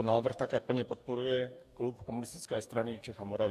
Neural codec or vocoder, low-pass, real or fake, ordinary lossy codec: codec, 44.1 kHz, 2.6 kbps, DAC; 14.4 kHz; fake; AAC, 96 kbps